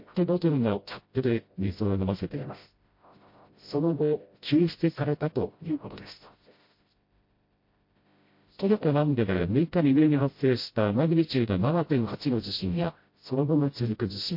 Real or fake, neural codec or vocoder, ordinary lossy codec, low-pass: fake; codec, 16 kHz, 0.5 kbps, FreqCodec, smaller model; MP3, 32 kbps; 5.4 kHz